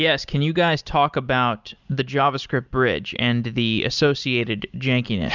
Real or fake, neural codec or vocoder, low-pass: real; none; 7.2 kHz